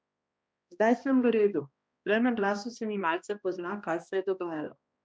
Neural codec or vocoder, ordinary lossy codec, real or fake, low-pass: codec, 16 kHz, 1 kbps, X-Codec, HuBERT features, trained on balanced general audio; none; fake; none